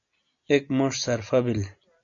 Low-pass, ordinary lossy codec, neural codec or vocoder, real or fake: 7.2 kHz; AAC, 64 kbps; none; real